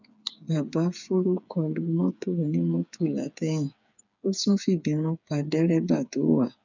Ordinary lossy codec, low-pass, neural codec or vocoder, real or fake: none; 7.2 kHz; codec, 16 kHz, 8 kbps, FreqCodec, smaller model; fake